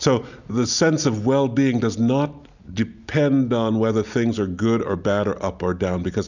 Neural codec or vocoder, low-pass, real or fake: none; 7.2 kHz; real